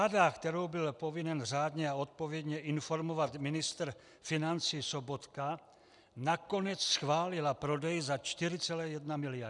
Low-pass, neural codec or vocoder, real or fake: 10.8 kHz; none; real